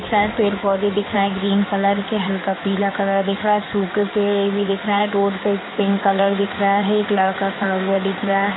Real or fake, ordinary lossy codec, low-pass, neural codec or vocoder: fake; AAC, 16 kbps; 7.2 kHz; codec, 16 kHz in and 24 kHz out, 2.2 kbps, FireRedTTS-2 codec